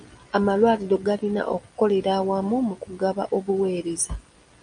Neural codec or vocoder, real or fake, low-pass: none; real; 9.9 kHz